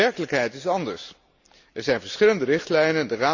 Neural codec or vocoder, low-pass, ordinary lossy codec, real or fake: none; 7.2 kHz; Opus, 64 kbps; real